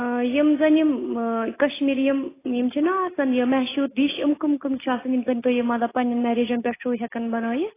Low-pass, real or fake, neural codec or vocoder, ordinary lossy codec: 3.6 kHz; real; none; AAC, 16 kbps